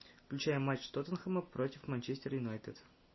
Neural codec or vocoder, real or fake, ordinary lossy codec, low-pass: none; real; MP3, 24 kbps; 7.2 kHz